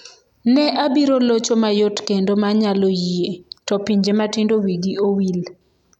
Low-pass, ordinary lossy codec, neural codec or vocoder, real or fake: 19.8 kHz; none; none; real